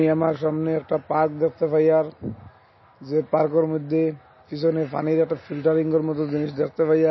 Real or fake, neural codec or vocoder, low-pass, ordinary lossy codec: real; none; 7.2 kHz; MP3, 24 kbps